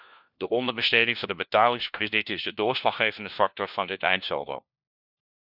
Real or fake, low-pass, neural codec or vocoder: fake; 5.4 kHz; codec, 16 kHz, 1 kbps, FunCodec, trained on LibriTTS, 50 frames a second